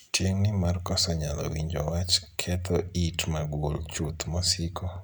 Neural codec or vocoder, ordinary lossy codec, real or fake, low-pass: none; none; real; none